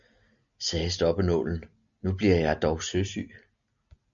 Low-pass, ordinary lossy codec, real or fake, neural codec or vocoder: 7.2 kHz; MP3, 96 kbps; real; none